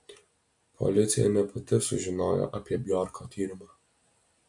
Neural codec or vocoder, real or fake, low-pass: none; real; 10.8 kHz